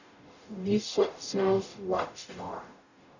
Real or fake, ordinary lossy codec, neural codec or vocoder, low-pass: fake; none; codec, 44.1 kHz, 0.9 kbps, DAC; 7.2 kHz